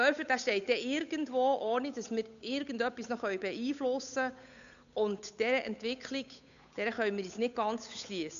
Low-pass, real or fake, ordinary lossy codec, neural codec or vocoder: 7.2 kHz; fake; none; codec, 16 kHz, 8 kbps, FunCodec, trained on Chinese and English, 25 frames a second